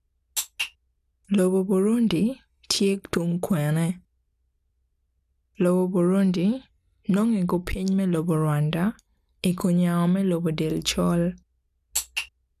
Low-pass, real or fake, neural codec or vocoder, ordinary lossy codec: 14.4 kHz; real; none; none